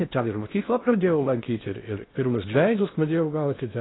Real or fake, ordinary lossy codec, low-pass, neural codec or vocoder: fake; AAC, 16 kbps; 7.2 kHz; codec, 16 kHz in and 24 kHz out, 0.6 kbps, FocalCodec, streaming, 2048 codes